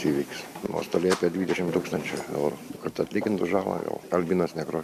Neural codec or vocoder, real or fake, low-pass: vocoder, 44.1 kHz, 128 mel bands every 512 samples, BigVGAN v2; fake; 14.4 kHz